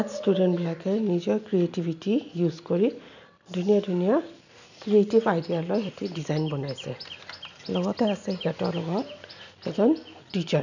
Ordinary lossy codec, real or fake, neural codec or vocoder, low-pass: none; real; none; 7.2 kHz